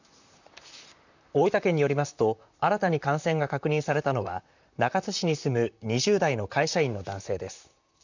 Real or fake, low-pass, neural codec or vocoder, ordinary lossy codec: fake; 7.2 kHz; vocoder, 44.1 kHz, 128 mel bands, Pupu-Vocoder; none